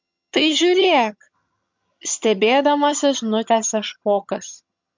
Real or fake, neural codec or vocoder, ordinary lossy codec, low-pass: fake; vocoder, 22.05 kHz, 80 mel bands, HiFi-GAN; MP3, 64 kbps; 7.2 kHz